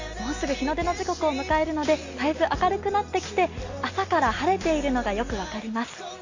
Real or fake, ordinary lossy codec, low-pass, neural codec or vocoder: real; AAC, 48 kbps; 7.2 kHz; none